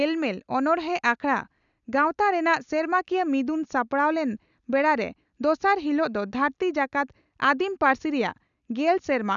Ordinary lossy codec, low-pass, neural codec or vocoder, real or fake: none; 7.2 kHz; none; real